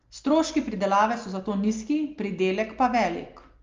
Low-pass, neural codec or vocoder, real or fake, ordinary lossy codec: 7.2 kHz; none; real; Opus, 24 kbps